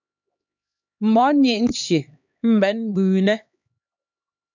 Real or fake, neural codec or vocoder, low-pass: fake; codec, 16 kHz, 2 kbps, X-Codec, HuBERT features, trained on LibriSpeech; 7.2 kHz